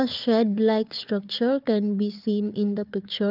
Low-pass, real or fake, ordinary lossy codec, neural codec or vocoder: 5.4 kHz; fake; Opus, 24 kbps; codec, 16 kHz, 4 kbps, FunCodec, trained on Chinese and English, 50 frames a second